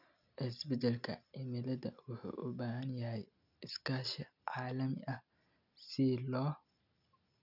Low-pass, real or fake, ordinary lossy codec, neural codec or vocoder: 5.4 kHz; real; none; none